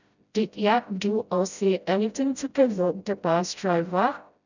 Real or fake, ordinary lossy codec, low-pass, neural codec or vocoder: fake; none; 7.2 kHz; codec, 16 kHz, 0.5 kbps, FreqCodec, smaller model